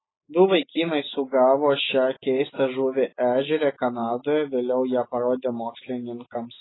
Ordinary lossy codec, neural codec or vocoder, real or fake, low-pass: AAC, 16 kbps; none; real; 7.2 kHz